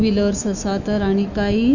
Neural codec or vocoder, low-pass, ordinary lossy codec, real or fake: autoencoder, 48 kHz, 128 numbers a frame, DAC-VAE, trained on Japanese speech; 7.2 kHz; none; fake